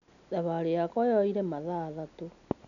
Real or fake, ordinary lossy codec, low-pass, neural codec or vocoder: real; none; 7.2 kHz; none